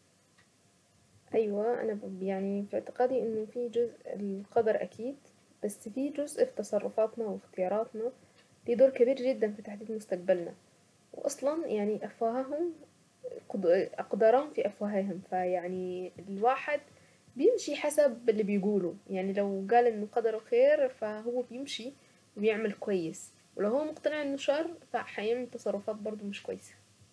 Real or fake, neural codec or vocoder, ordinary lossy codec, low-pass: real; none; none; none